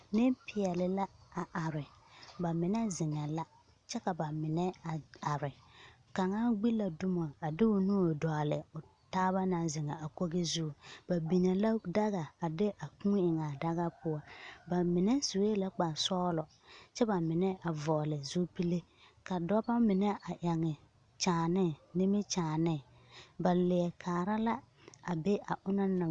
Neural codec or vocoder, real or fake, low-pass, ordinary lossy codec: none; real; 9.9 kHz; Opus, 64 kbps